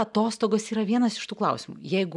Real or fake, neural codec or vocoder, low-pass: real; none; 10.8 kHz